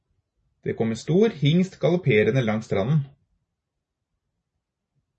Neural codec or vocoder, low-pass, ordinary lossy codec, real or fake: none; 10.8 kHz; MP3, 32 kbps; real